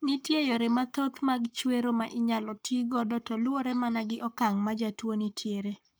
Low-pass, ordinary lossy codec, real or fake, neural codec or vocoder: none; none; fake; codec, 44.1 kHz, 7.8 kbps, Pupu-Codec